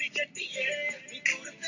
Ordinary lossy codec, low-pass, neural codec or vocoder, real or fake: AAC, 48 kbps; 7.2 kHz; none; real